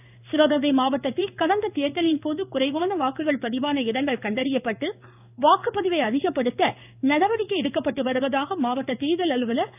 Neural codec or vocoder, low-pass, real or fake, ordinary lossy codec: codec, 16 kHz in and 24 kHz out, 1 kbps, XY-Tokenizer; 3.6 kHz; fake; none